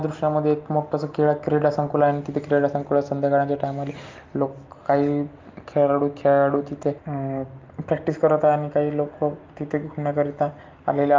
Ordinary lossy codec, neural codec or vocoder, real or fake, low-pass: Opus, 32 kbps; none; real; 7.2 kHz